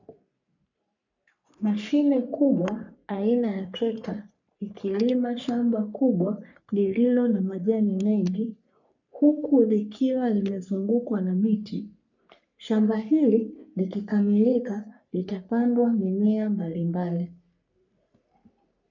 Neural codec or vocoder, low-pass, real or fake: codec, 44.1 kHz, 3.4 kbps, Pupu-Codec; 7.2 kHz; fake